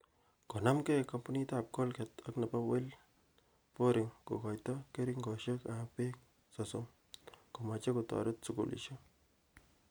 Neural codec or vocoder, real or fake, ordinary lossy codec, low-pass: none; real; none; none